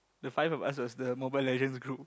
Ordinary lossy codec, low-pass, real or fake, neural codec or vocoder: none; none; real; none